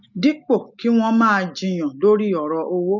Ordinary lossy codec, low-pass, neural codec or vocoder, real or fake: none; 7.2 kHz; none; real